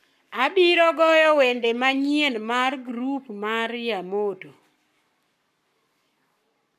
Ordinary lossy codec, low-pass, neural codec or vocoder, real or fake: none; 14.4 kHz; codec, 44.1 kHz, 7.8 kbps, Pupu-Codec; fake